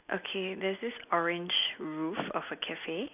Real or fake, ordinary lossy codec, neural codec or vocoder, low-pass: real; none; none; 3.6 kHz